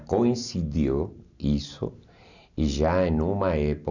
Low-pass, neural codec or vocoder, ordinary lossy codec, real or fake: 7.2 kHz; none; none; real